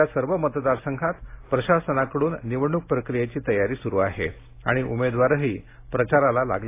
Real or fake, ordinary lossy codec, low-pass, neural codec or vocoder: real; AAC, 24 kbps; 3.6 kHz; none